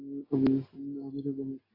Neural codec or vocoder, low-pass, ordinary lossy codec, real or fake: none; 5.4 kHz; AAC, 24 kbps; real